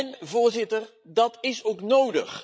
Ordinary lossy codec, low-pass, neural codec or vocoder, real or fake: none; none; codec, 16 kHz, 16 kbps, FreqCodec, larger model; fake